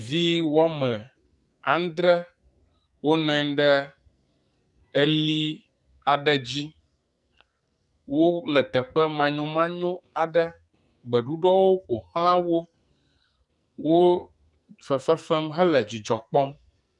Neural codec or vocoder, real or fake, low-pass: codec, 44.1 kHz, 2.6 kbps, SNAC; fake; 10.8 kHz